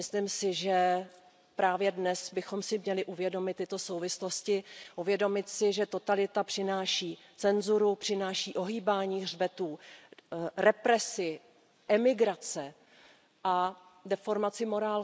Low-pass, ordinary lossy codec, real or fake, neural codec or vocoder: none; none; real; none